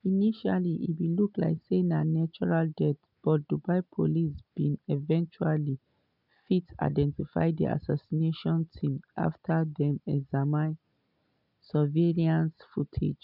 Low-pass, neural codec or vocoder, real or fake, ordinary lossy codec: 5.4 kHz; none; real; none